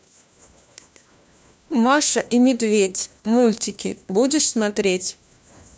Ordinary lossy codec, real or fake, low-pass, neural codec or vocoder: none; fake; none; codec, 16 kHz, 1 kbps, FunCodec, trained on LibriTTS, 50 frames a second